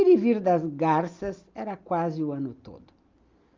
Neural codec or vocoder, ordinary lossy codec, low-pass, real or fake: none; Opus, 24 kbps; 7.2 kHz; real